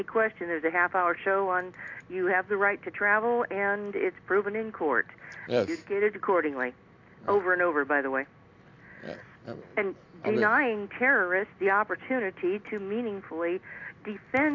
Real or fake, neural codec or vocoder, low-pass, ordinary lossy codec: real; none; 7.2 kHz; AAC, 48 kbps